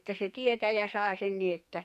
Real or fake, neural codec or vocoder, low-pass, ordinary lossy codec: fake; codec, 44.1 kHz, 2.6 kbps, SNAC; 14.4 kHz; none